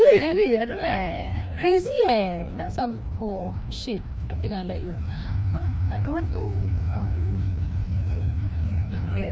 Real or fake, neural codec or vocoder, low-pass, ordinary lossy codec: fake; codec, 16 kHz, 1 kbps, FreqCodec, larger model; none; none